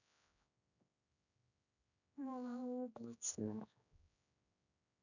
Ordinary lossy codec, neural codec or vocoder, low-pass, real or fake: none; codec, 16 kHz, 1 kbps, X-Codec, HuBERT features, trained on general audio; 7.2 kHz; fake